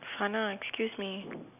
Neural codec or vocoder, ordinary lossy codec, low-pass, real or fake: none; none; 3.6 kHz; real